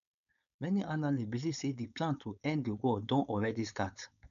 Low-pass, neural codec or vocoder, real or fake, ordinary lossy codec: 7.2 kHz; codec, 16 kHz, 4.8 kbps, FACodec; fake; none